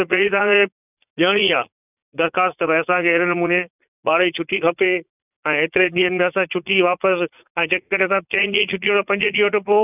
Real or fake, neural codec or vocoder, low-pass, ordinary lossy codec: fake; vocoder, 44.1 kHz, 80 mel bands, Vocos; 3.6 kHz; none